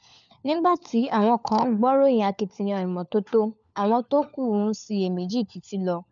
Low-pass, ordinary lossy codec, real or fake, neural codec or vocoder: 7.2 kHz; none; fake; codec, 16 kHz, 4 kbps, FunCodec, trained on LibriTTS, 50 frames a second